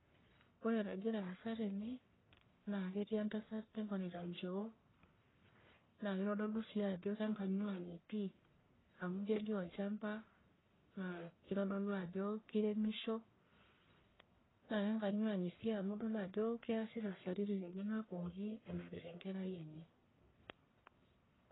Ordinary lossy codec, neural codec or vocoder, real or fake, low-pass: AAC, 16 kbps; codec, 44.1 kHz, 1.7 kbps, Pupu-Codec; fake; 7.2 kHz